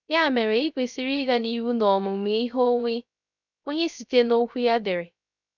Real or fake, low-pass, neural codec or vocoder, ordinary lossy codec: fake; 7.2 kHz; codec, 16 kHz, 0.3 kbps, FocalCodec; none